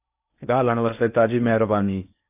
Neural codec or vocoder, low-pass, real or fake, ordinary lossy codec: codec, 16 kHz in and 24 kHz out, 0.8 kbps, FocalCodec, streaming, 65536 codes; 3.6 kHz; fake; AAC, 32 kbps